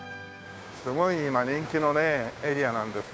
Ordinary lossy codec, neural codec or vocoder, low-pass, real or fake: none; codec, 16 kHz, 6 kbps, DAC; none; fake